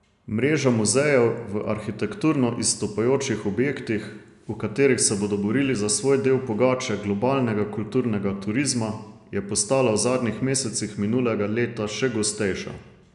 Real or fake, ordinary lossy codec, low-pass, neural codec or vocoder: real; none; 10.8 kHz; none